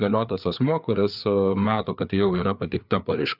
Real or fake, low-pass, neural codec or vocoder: fake; 5.4 kHz; codec, 16 kHz, 4 kbps, FreqCodec, larger model